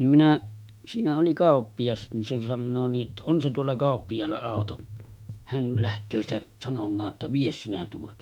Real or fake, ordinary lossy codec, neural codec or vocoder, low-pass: fake; none; autoencoder, 48 kHz, 32 numbers a frame, DAC-VAE, trained on Japanese speech; 19.8 kHz